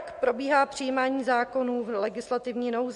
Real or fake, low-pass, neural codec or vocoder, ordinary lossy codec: real; 14.4 kHz; none; MP3, 48 kbps